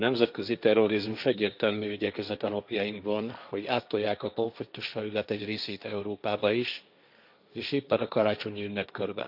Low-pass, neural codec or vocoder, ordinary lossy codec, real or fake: 5.4 kHz; codec, 16 kHz, 1.1 kbps, Voila-Tokenizer; none; fake